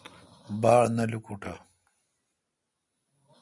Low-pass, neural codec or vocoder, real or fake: 10.8 kHz; none; real